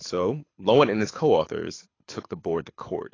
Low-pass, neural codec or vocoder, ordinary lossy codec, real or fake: 7.2 kHz; codec, 24 kHz, 6 kbps, HILCodec; AAC, 32 kbps; fake